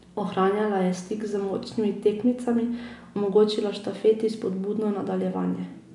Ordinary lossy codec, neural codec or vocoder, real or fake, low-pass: none; none; real; 10.8 kHz